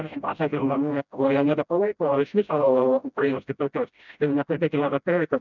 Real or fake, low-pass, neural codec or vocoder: fake; 7.2 kHz; codec, 16 kHz, 0.5 kbps, FreqCodec, smaller model